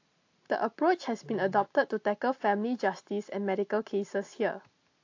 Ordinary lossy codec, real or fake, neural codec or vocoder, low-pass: AAC, 48 kbps; real; none; 7.2 kHz